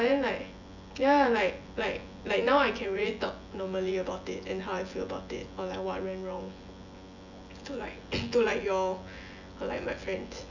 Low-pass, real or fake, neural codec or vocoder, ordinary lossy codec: 7.2 kHz; fake; vocoder, 24 kHz, 100 mel bands, Vocos; none